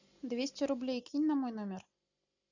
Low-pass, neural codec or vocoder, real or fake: 7.2 kHz; none; real